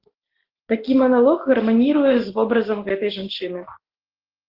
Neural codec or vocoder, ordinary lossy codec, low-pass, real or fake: none; Opus, 16 kbps; 5.4 kHz; real